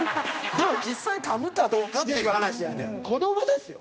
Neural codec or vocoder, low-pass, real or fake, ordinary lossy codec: codec, 16 kHz, 1 kbps, X-Codec, HuBERT features, trained on general audio; none; fake; none